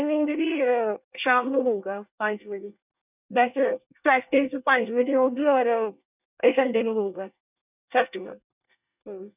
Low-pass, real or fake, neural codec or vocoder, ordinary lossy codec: 3.6 kHz; fake; codec, 24 kHz, 1 kbps, SNAC; none